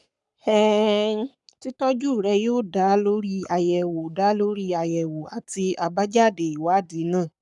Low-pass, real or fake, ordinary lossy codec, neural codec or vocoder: 10.8 kHz; fake; none; codec, 44.1 kHz, 7.8 kbps, Pupu-Codec